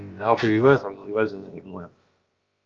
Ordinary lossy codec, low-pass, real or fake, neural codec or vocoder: Opus, 24 kbps; 7.2 kHz; fake; codec, 16 kHz, about 1 kbps, DyCAST, with the encoder's durations